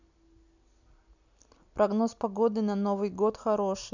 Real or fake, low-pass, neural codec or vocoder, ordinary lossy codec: real; 7.2 kHz; none; none